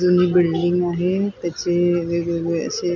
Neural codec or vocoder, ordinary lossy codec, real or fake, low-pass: none; none; real; 7.2 kHz